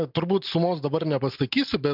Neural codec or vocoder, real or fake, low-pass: none; real; 5.4 kHz